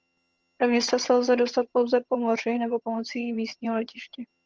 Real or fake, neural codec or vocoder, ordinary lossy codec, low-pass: fake; vocoder, 22.05 kHz, 80 mel bands, HiFi-GAN; Opus, 24 kbps; 7.2 kHz